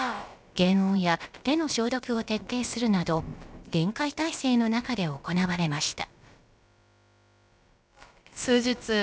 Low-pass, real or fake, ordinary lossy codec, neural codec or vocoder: none; fake; none; codec, 16 kHz, about 1 kbps, DyCAST, with the encoder's durations